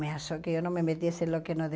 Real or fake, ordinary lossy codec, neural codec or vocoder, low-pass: real; none; none; none